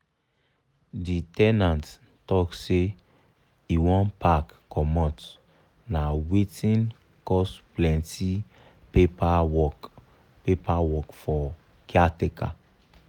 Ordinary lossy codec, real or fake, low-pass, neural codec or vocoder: none; real; 19.8 kHz; none